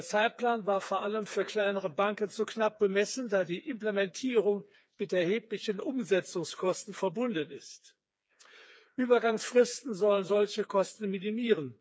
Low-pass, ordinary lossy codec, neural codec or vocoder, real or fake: none; none; codec, 16 kHz, 4 kbps, FreqCodec, smaller model; fake